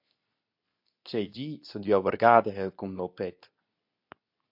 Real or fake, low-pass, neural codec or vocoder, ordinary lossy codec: fake; 5.4 kHz; codec, 24 kHz, 0.9 kbps, WavTokenizer, medium speech release version 2; AAC, 48 kbps